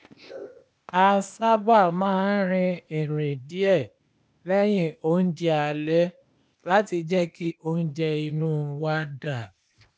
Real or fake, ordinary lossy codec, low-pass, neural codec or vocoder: fake; none; none; codec, 16 kHz, 0.8 kbps, ZipCodec